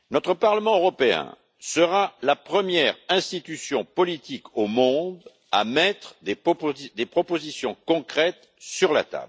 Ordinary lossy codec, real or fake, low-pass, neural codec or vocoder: none; real; none; none